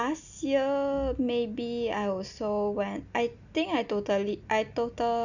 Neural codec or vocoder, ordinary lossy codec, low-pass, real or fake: none; none; 7.2 kHz; real